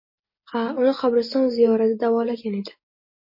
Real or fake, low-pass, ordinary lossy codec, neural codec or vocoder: real; 5.4 kHz; MP3, 24 kbps; none